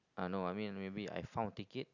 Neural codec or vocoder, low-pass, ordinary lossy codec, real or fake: none; 7.2 kHz; none; real